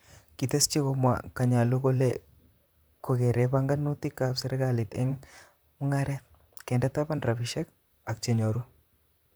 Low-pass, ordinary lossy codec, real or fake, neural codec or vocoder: none; none; fake; vocoder, 44.1 kHz, 128 mel bands, Pupu-Vocoder